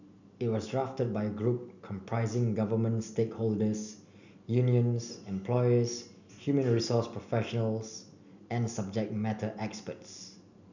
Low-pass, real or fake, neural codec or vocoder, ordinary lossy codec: 7.2 kHz; real; none; none